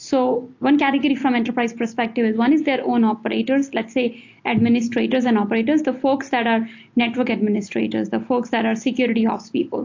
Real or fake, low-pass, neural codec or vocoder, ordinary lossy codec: real; 7.2 kHz; none; AAC, 48 kbps